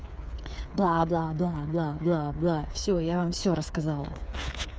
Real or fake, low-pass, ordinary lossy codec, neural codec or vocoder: fake; none; none; codec, 16 kHz, 8 kbps, FreqCodec, smaller model